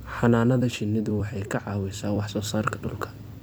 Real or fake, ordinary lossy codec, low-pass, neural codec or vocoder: fake; none; none; vocoder, 44.1 kHz, 128 mel bands every 256 samples, BigVGAN v2